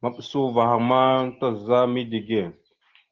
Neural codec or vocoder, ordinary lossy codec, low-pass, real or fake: none; Opus, 16 kbps; 7.2 kHz; real